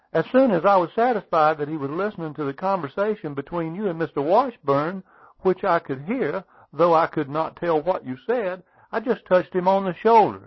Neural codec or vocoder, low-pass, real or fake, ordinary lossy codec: none; 7.2 kHz; real; MP3, 24 kbps